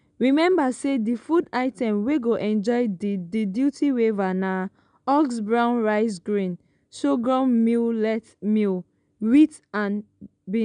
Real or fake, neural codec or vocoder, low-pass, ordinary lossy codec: real; none; 9.9 kHz; none